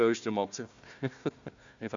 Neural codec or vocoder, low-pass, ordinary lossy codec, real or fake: codec, 16 kHz, 1 kbps, FunCodec, trained on Chinese and English, 50 frames a second; 7.2 kHz; MP3, 64 kbps; fake